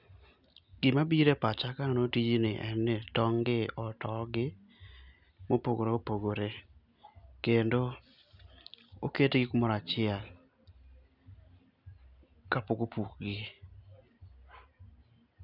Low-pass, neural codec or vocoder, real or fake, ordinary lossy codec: 5.4 kHz; none; real; none